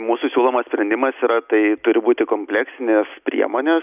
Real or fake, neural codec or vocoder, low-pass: real; none; 3.6 kHz